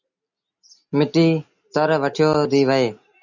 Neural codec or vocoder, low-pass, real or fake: none; 7.2 kHz; real